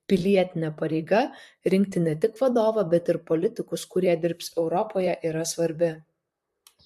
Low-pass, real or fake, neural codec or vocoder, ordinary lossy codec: 14.4 kHz; fake; vocoder, 44.1 kHz, 128 mel bands, Pupu-Vocoder; MP3, 64 kbps